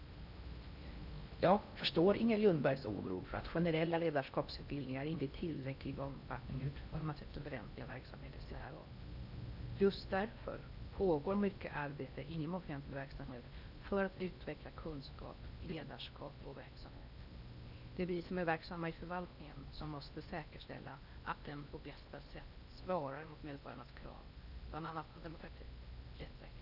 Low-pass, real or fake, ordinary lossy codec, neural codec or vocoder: 5.4 kHz; fake; none; codec, 16 kHz in and 24 kHz out, 0.6 kbps, FocalCodec, streaming, 4096 codes